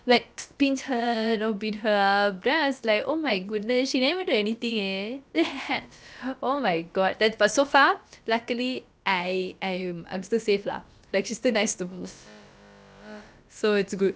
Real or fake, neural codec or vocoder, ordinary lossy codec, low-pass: fake; codec, 16 kHz, about 1 kbps, DyCAST, with the encoder's durations; none; none